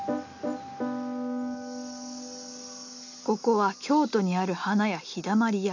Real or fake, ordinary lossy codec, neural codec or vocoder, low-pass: real; none; none; 7.2 kHz